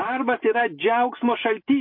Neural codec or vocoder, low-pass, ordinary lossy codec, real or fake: none; 5.4 kHz; MP3, 32 kbps; real